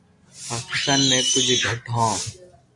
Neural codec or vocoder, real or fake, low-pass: none; real; 10.8 kHz